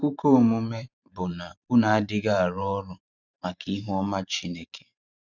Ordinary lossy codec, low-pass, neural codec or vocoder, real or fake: none; 7.2 kHz; vocoder, 44.1 kHz, 128 mel bands every 512 samples, BigVGAN v2; fake